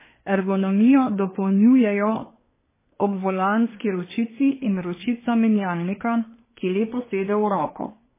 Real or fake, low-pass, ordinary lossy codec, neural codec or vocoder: fake; 3.6 kHz; MP3, 16 kbps; codec, 24 kHz, 1 kbps, SNAC